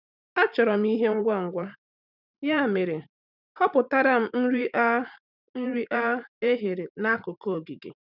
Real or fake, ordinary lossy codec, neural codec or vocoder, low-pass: fake; none; vocoder, 44.1 kHz, 128 mel bands every 512 samples, BigVGAN v2; 5.4 kHz